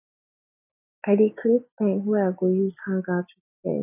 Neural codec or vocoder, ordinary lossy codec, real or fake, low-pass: none; none; real; 3.6 kHz